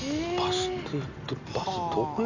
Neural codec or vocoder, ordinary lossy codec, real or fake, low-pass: none; none; real; 7.2 kHz